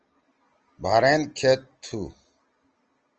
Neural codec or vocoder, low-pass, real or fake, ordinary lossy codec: none; 7.2 kHz; real; Opus, 24 kbps